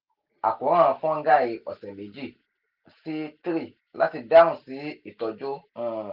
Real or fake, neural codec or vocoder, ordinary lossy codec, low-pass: real; none; Opus, 32 kbps; 5.4 kHz